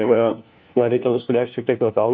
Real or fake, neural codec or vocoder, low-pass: fake; codec, 16 kHz, 1 kbps, FunCodec, trained on LibriTTS, 50 frames a second; 7.2 kHz